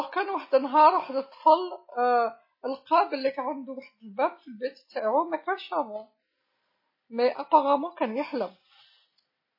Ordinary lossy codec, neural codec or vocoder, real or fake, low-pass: MP3, 24 kbps; none; real; 5.4 kHz